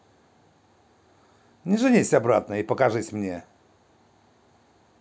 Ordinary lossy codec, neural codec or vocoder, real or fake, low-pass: none; none; real; none